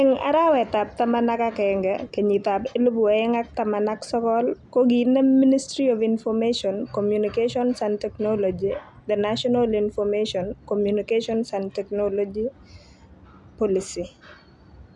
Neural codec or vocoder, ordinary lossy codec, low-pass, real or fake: none; none; 10.8 kHz; real